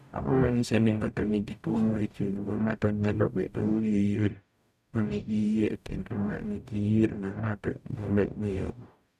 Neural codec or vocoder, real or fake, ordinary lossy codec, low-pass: codec, 44.1 kHz, 0.9 kbps, DAC; fake; none; 14.4 kHz